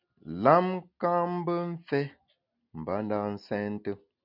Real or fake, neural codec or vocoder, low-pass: real; none; 5.4 kHz